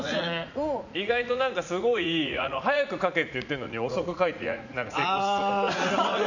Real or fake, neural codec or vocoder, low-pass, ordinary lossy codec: fake; vocoder, 44.1 kHz, 80 mel bands, Vocos; 7.2 kHz; none